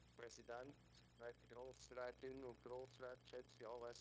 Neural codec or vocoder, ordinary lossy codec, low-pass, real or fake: codec, 16 kHz, 0.9 kbps, LongCat-Audio-Codec; none; none; fake